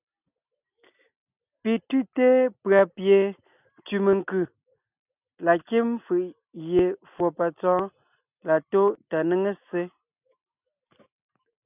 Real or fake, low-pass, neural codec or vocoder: real; 3.6 kHz; none